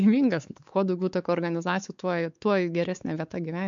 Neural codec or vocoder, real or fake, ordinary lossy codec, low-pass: codec, 16 kHz, 4 kbps, X-Codec, WavLM features, trained on Multilingual LibriSpeech; fake; MP3, 64 kbps; 7.2 kHz